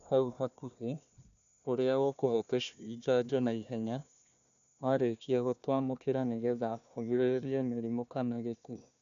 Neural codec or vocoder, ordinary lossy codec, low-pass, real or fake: codec, 16 kHz, 1 kbps, FunCodec, trained on Chinese and English, 50 frames a second; none; 7.2 kHz; fake